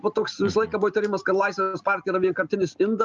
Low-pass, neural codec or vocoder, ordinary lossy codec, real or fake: 7.2 kHz; none; Opus, 32 kbps; real